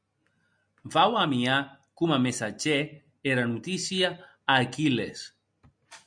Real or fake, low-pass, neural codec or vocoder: real; 9.9 kHz; none